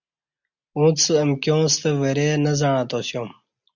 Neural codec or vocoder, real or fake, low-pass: none; real; 7.2 kHz